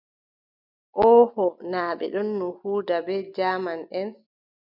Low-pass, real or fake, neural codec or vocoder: 5.4 kHz; real; none